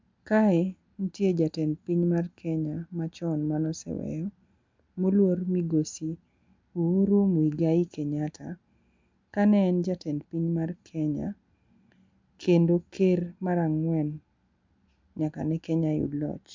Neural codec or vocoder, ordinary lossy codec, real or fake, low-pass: none; MP3, 64 kbps; real; 7.2 kHz